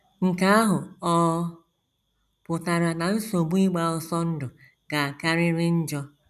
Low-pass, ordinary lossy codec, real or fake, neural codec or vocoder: 14.4 kHz; none; real; none